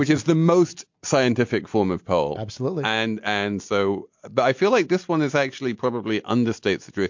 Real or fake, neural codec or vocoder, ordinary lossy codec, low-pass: real; none; MP3, 48 kbps; 7.2 kHz